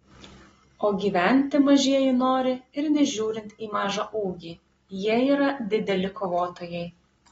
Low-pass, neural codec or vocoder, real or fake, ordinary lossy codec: 19.8 kHz; none; real; AAC, 24 kbps